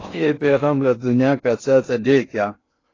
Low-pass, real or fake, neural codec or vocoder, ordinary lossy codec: 7.2 kHz; fake; codec, 16 kHz in and 24 kHz out, 0.6 kbps, FocalCodec, streaming, 2048 codes; AAC, 32 kbps